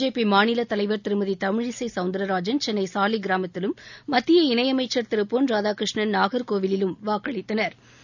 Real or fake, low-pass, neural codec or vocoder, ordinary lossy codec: real; 7.2 kHz; none; none